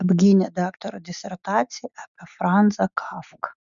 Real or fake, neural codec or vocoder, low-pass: real; none; 7.2 kHz